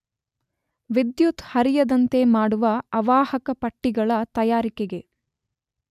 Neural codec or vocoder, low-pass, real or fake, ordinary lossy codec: none; 14.4 kHz; real; none